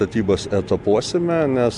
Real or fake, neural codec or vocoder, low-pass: real; none; 10.8 kHz